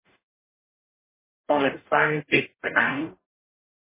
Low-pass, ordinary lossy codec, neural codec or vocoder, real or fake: 3.6 kHz; MP3, 16 kbps; codec, 44.1 kHz, 0.9 kbps, DAC; fake